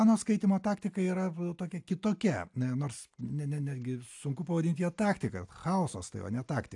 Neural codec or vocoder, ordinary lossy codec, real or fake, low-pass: none; AAC, 64 kbps; real; 10.8 kHz